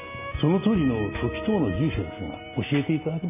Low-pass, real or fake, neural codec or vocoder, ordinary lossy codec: 3.6 kHz; real; none; none